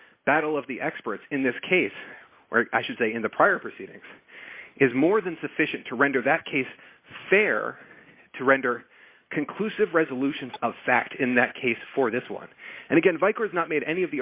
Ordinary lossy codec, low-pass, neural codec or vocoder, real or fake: Opus, 64 kbps; 3.6 kHz; none; real